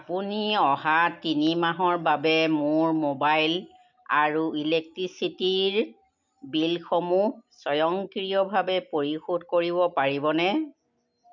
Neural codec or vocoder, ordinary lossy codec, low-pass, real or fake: none; MP3, 64 kbps; 7.2 kHz; real